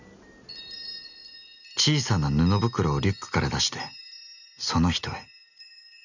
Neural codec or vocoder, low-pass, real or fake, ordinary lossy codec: none; 7.2 kHz; real; none